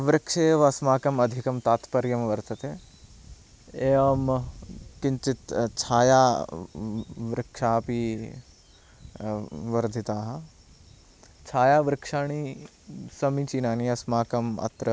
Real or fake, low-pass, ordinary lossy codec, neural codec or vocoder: real; none; none; none